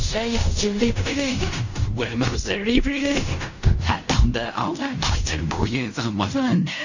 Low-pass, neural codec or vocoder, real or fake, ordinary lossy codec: 7.2 kHz; codec, 16 kHz in and 24 kHz out, 0.4 kbps, LongCat-Audio-Codec, fine tuned four codebook decoder; fake; none